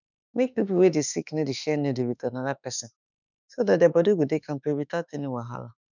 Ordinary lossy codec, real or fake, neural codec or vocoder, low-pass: none; fake; autoencoder, 48 kHz, 32 numbers a frame, DAC-VAE, trained on Japanese speech; 7.2 kHz